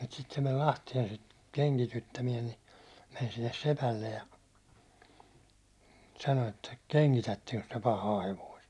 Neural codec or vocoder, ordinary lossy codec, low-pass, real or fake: none; none; none; real